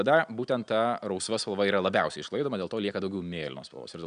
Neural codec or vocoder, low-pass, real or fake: none; 9.9 kHz; real